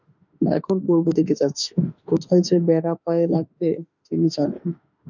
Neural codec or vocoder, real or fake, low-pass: autoencoder, 48 kHz, 32 numbers a frame, DAC-VAE, trained on Japanese speech; fake; 7.2 kHz